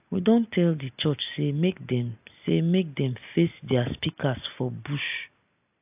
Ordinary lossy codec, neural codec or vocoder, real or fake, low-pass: AAC, 32 kbps; none; real; 3.6 kHz